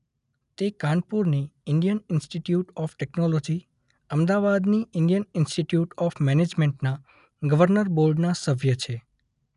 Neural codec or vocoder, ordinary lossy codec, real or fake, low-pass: none; none; real; 10.8 kHz